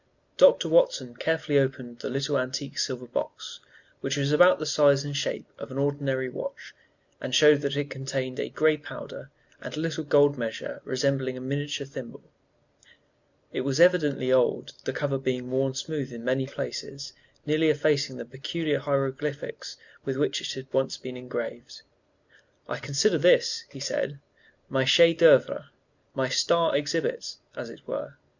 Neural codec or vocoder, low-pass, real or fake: none; 7.2 kHz; real